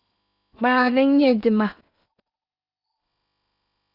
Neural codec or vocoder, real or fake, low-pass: codec, 16 kHz in and 24 kHz out, 0.8 kbps, FocalCodec, streaming, 65536 codes; fake; 5.4 kHz